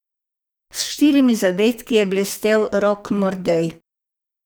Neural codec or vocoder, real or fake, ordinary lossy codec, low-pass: codec, 44.1 kHz, 2.6 kbps, SNAC; fake; none; none